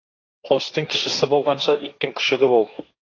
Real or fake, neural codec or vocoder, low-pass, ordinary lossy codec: fake; codec, 16 kHz, 1.1 kbps, Voila-Tokenizer; 7.2 kHz; AAC, 32 kbps